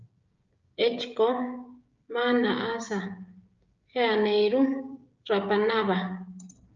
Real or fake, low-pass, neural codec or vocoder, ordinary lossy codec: fake; 7.2 kHz; codec, 16 kHz, 16 kbps, FreqCodec, smaller model; Opus, 24 kbps